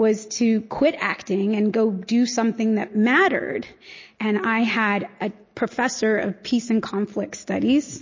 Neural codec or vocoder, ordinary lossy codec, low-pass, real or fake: none; MP3, 32 kbps; 7.2 kHz; real